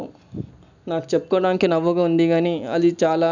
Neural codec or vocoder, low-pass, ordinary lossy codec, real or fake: none; 7.2 kHz; none; real